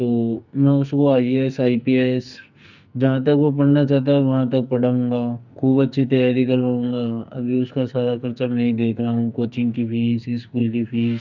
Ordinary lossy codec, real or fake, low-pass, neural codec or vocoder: none; fake; 7.2 kHz; codec, 44.1 kHz, 2.6 kbps, SNAC